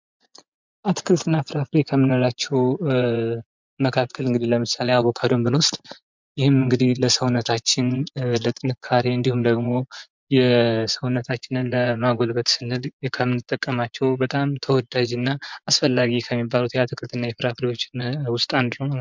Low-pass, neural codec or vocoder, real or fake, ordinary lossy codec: 7.2 kHz; vocoder, 24 kHz, 100 mel bands, Vocos; fake; MP3, 64 kbps